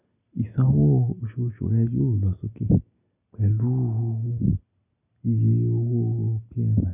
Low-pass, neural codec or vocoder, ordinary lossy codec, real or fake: 3.6 kHz; none; none; real